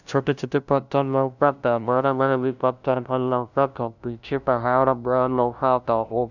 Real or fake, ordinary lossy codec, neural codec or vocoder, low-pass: fake; none; codec, 16 kHz, 0.5 kbps, FunCodec, trained on LibriTTS, 25 frames a second; 7.2 kHz